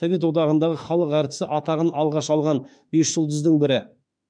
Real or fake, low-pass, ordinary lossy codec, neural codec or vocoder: fake; 9.9 kHz; none; autoencoder, 48 kHz, 32 numbers a frame, DAC-VAE, trained on Japanese speech